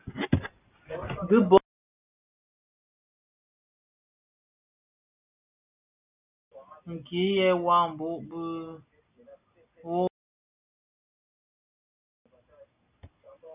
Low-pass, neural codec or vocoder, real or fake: 3.6 kHz; none; real